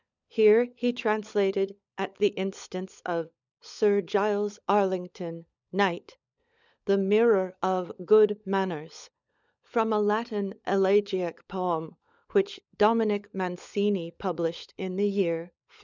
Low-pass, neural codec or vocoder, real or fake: 7.2 kHz; codec, 16 kHz, 16 kbps, FunCodec, trained on LibriTTS, 50 frames a second; fake